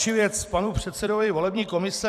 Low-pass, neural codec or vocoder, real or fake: 14.4 kHz; vocoder, 44.1 kHz, 128 mel bands every 256 samples, BigVGAN v2; fake